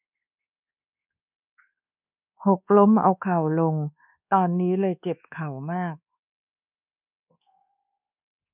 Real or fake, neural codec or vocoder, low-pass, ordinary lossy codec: fake; codec, 24 kHz, 1.2 kbps, DualCodec; 3.6 kHz; none